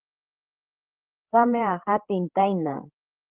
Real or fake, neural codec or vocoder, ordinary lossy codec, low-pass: fake; codec, 16 kHz, 8 kbps, FreqCodec, larger model; Opus, 16 kbps; 3.6 kHz